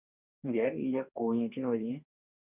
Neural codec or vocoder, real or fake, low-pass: codec, 44.1 kHz, 2.6 kbps, DAC; fake; 3.6 kHz